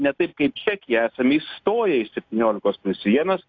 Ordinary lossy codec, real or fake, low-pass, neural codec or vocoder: AAC, 48 kbps; real; 7.2 kHz; none